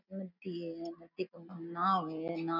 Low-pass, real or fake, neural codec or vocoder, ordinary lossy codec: 5.4 kHz; real; none; none